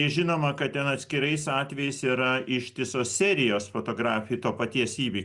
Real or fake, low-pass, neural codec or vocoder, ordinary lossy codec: real; 10.8 kHz; none; Opus, 64 kbps